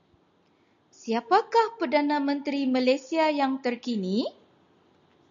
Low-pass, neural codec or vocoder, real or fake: 7.2 kHz; none; real